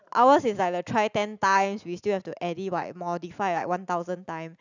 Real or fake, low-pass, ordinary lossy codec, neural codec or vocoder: real; 7.2 kHz; none; none